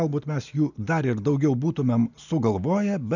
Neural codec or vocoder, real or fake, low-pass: none; real; 7.2 kHz